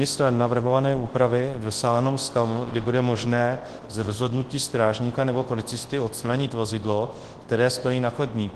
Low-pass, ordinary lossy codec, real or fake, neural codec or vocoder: 10.8 kHz; Opus, 16 kbps; fake; codec, 24 kHz, 0.9 kbps, WavTokenizer, large speech release